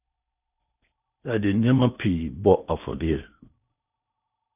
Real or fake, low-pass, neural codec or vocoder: fake; 3.6 kHz; codec, 16 kHz in and 24 kHz out, 0.8 kbps, FocalCodec, streaming, 65536 codes